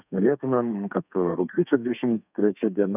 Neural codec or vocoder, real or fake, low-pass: codec, 32 kHz, 1.9 kbps, SNAC; fake; 3.6 kHz